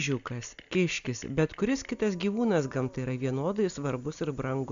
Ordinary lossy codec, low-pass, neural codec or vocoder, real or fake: AAC, 96 kbps; 7.2 kHz; none; real